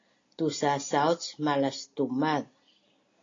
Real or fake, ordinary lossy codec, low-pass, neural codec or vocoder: real; AAC, 32 kbps; 7.2 kHz; none